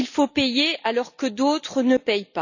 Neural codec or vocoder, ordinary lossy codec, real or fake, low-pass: none; none; real; 7.2 kHz